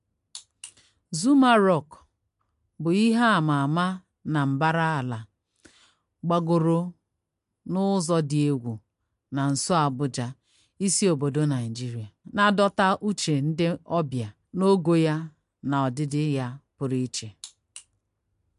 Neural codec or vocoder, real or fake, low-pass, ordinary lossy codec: none; real; 10.8 kHz; MP3, 64 kbps